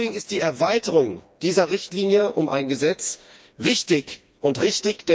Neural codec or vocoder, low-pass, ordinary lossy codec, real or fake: codec, 16 kHz, 2 kbps, FreqCodec, smaller model; none; none; fake